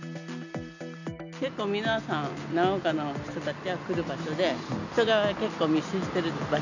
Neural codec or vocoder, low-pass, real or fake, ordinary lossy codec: none; 7.2 kHz; real; none